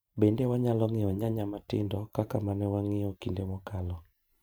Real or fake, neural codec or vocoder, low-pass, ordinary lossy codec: fake; vocoder, 44.1 kHz, 128 mel bands every 512 samples, BigVGAN v2; none; none